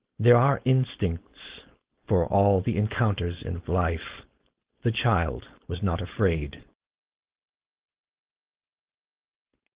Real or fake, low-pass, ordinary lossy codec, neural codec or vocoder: fake; 3.6 kHz; Opus, 16 kbps; codec, 16 kHz, 4.8 kbps, FACodec